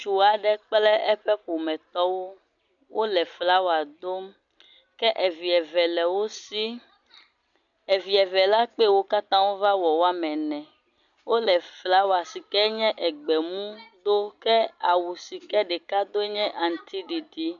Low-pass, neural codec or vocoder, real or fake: 7.2 kHz; none; real